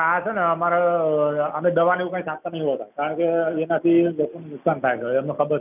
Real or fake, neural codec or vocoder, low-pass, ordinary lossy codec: real; none; 3.6 kHz; none